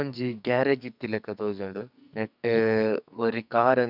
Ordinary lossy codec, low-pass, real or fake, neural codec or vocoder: none; 5.4 kHz; fake; codec, 44.1 kHz, 2.6 kbps, SNAC